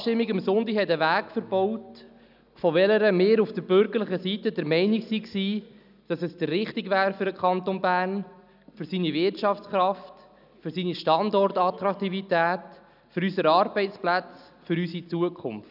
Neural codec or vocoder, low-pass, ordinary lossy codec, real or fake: none; 5.4 kHz; none; real